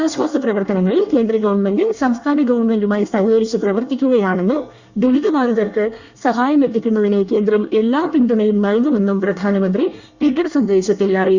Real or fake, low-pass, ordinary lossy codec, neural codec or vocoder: fake; 7.2 kHz; Opus, 64 kbps; codec, 24 kHz, 1 kbps, SNAC